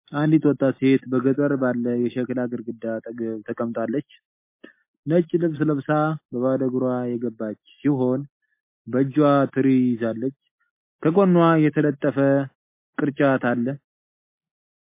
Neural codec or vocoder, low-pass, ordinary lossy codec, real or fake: none; 3.6 kHz; MP3, 24 kbps; real